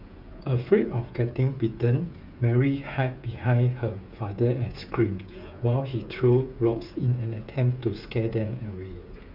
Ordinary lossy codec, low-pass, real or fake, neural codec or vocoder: none; 5.4 kHz; fake; codec, 16 kHz, 8 kbps, FreqCodec, smaller model